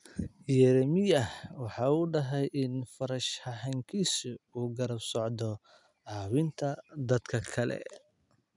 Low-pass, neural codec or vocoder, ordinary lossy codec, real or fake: 10.8 kHz; none; none; real